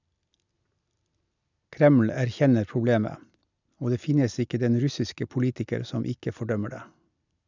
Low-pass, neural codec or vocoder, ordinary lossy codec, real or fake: 7.2 kHz; none; none; real